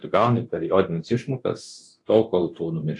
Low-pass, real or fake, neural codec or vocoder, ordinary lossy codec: 10.8 kHz; fake; codec, 24 kHz, 0.9 kbps, DualCodec; AAC, 48 kbps